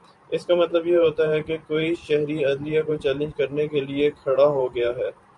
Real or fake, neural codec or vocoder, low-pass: fake; vocoder, 44.1 kHz, 128 mel bands every 512 samples, BigVGAN v2; 10.8 kHz